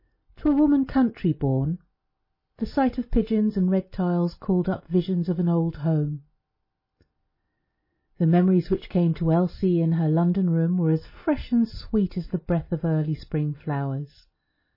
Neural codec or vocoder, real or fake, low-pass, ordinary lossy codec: none; real; 5.4 kHz; MP3, 24 kbps